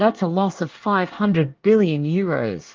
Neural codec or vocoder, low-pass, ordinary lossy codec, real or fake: codec, 24 kHz, 1 kbps, SNAC; 7.2 kHz; Opus, 24 kbps; fake